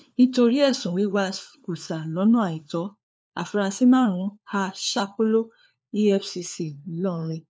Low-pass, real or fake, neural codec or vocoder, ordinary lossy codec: none; fake; codec, 16 kHz, 4 kbps, FunCodec, trained on LibriTTS, 50 frames a second; none